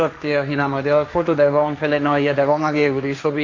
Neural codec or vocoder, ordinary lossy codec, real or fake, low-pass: codec, 16 kHz, 1.1 kbps, Voila-Tokenizer; AAC, 48 kbps; fake; 7.2 kHz